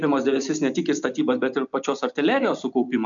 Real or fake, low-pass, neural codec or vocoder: real; 7.2 kHz; none